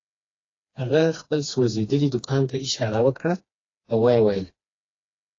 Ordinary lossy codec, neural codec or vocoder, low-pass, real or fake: AAC, 32 kbps; codec, 16 kHz, 2 kbps, FreqCodec, smaller model; 7.2 kHz; fake